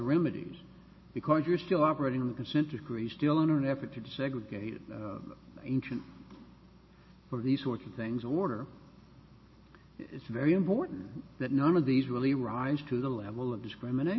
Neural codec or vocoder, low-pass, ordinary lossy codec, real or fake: none; 7.2 kHz; MP3, 24 kbps; real